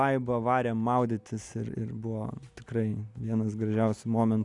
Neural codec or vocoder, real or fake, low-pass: none; real; 10.8 kHz